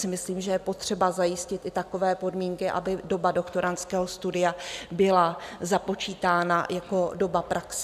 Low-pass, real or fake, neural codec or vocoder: 14.4 kHz; real; none